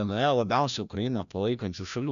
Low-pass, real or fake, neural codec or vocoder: 7.2 kHz; fake; codec, 16 kHz, 1 kbps, FreqCodec, larger model